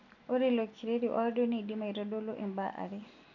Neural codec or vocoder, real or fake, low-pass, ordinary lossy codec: none; real; 7.2 kHz; none